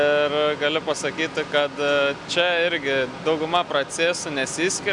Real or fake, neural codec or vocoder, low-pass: real; none; 10.8 kHz